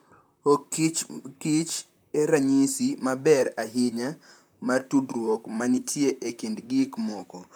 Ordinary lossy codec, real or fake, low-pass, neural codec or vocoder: none; fake; none; vocoder, 44.1 kHz, 128 mel bands, Pupu-Vocoder